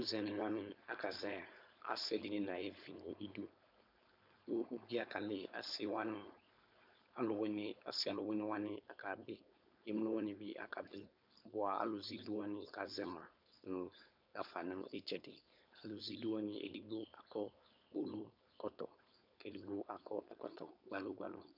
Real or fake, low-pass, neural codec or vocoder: fake; 5.4 kHz; codec, 16 kHz, 4.8 kbps, FACodec